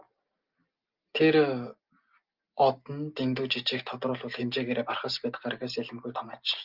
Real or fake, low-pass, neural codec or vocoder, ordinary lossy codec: real; 5.4 kHz; none; Opus, 32 kbps